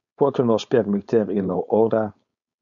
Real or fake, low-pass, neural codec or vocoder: fake; 7.2 kHz; codec, 16 kHz, 4.8 kbps, FACodec